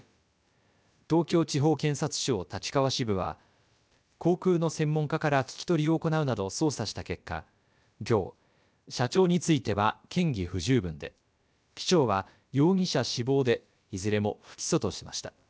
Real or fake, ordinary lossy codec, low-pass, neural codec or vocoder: fake; none; none; codec, 16 kHz, about 1 kbps, DyCAST, with the encoder's durations